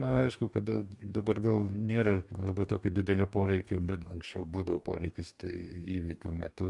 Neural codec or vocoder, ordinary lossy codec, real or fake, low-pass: codec, 44.1 kHz, 2.6 kbps, DAC; AAC, 64 kbps; fake; 10.8 kHz